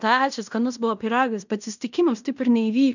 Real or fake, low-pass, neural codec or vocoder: fake; 7.2 kHz; codec, 16 kHz in and 24 kHz out, 0.9 kbps, LongCat-Audio-Codec, fine tuned four codebook decoder